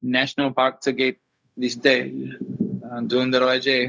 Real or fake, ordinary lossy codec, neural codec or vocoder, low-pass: fake; none; codec, 16 kHz, 0.4 kbps, LongCat-Audio-Codec; none